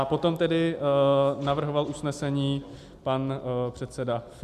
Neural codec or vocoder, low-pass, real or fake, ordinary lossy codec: none; 14.4 kHz; real; AAC, 96 kbps